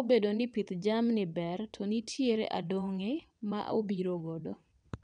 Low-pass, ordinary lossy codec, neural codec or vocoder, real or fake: 9.9 kHz; none; vocoder, 22.05 kHz, 80 mel bands, WaveNeXt; fake